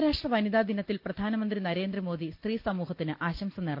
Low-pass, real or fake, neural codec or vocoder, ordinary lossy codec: 5.4 kHz; real; none; Opus, 24 kbps